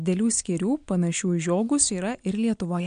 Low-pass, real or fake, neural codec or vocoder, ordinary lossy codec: 9.9 kHz; real; none; MP3, 64 kbps